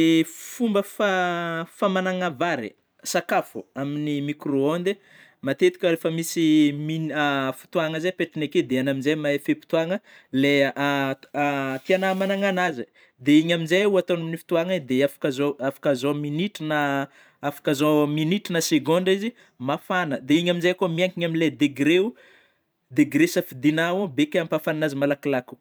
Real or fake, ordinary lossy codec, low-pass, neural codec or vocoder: fake; none; none; vocoder, 44.1 kHz, 128 mel bands every 512 samples, BigVGAN v2